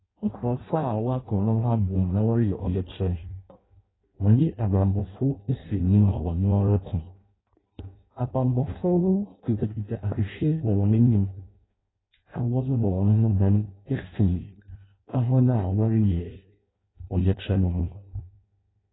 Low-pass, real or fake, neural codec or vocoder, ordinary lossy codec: 7.2 kHz; fake; codec, 16 kHz in and 24 kHz out, 0.6 kbps, FireRedTTS-2 codec; AAC, 16 kbps